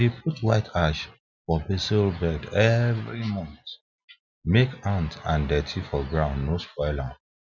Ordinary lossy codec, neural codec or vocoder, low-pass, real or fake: none; none; 7.2 kHz; real